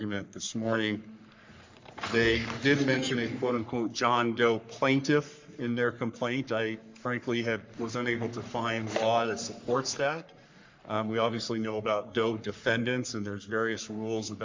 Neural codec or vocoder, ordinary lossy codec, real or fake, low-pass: codec, 44.1 kHz, 3.4 kbps, Pupu-Codec; MP3, 64 kbps; fake; 7.2 kHz